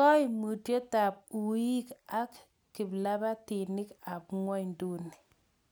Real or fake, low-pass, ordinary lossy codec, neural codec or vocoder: real; none; none; none